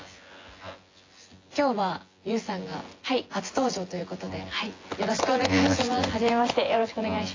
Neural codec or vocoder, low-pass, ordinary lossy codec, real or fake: vocoder, 24 kHz, 100 mel bands, Vocos; 7.2 kHz; AAC, 32 kbps; fake